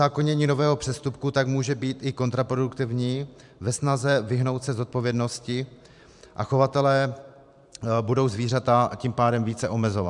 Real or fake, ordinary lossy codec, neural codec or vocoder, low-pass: fake; MP3, 96 kbps; vocoder, 44.1 kHz, 128 mel bands every 512 samples, BigVGAN v2; 10.8 kHz